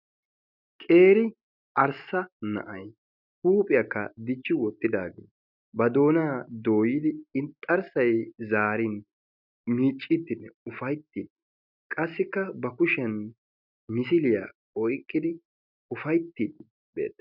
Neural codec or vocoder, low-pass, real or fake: none; 5.4 kHz; real